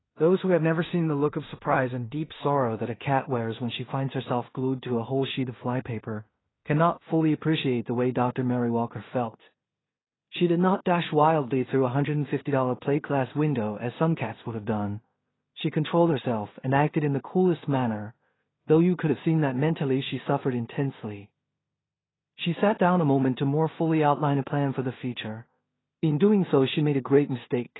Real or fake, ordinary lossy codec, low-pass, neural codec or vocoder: fake; AAC, 16 kbps; 7.2 kHz; codec, 16 kHz in and 24 kHz out, 0.4 kbps, LongCat-Audio-Codec, two codebook decoder